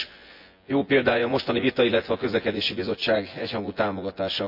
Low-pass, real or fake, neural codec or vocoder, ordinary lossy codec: 5.4 kHz; fake; vocoder, 24 kHz, 100 mel bands, Vocos; MP3, 48 kbps